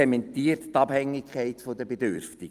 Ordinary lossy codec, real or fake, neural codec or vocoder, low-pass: Opus, 32 kbps; fake; vocoder, 44.1 kHz, 128 mel bands every 256 samples, BigVGAN v2; 14.4 kHz